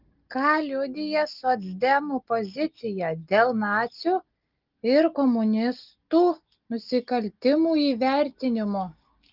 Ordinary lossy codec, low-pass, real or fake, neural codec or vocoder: Opus, 32 kbps; 5.4 kHz; real; none